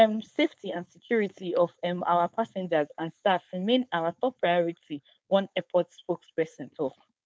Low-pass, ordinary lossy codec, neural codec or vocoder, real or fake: none; none; codec, 16 kHz, 4.8 kbps, FACodec; fake